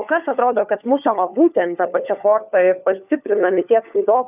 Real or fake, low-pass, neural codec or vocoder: fake; 3.6 kHz; codec, 16 kHz, 4 kbps, FunCodec, trained on LibriTTS, 50 frames a second